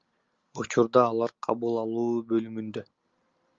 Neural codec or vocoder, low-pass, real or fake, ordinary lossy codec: none; 7.2 kHz; real; Opus, 32 kbps